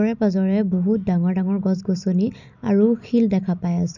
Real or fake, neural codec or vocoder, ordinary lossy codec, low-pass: real; none; none; 7.2 kHz